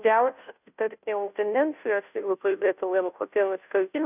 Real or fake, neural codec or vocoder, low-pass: fake; codec, 16 kHz, 0.5 kbps, FunCodec, trained on Chinese and English, 25 frames a second; 3.6 kHz